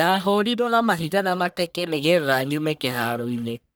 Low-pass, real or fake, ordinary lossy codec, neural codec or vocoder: none; fake; none; codec, 44.1 kHz, 1.7 kbps, Pupu-Codec